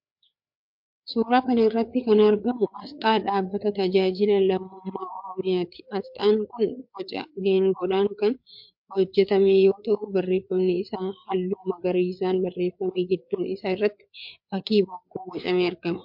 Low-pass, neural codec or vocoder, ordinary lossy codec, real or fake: 5.4 kHz; codec, 16 kHz, 4 kbps, FreqCodec, larger model; MP3, 48 kbps; fake